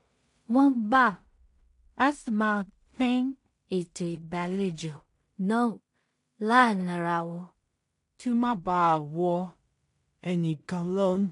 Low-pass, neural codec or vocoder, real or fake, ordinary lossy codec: 10.8 kHz; codec, 16 kHz in and 24 kHz out, 0.4 kbps, LongCat-Audio-Codec, two codebook decoder; fake; MP3, 64 kbps